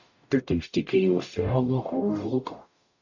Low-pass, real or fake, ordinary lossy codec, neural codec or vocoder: 7.2 kHz; fake; AAC, 48 kbps; codec, 44.1 kHz, 0.9 kbps, DAC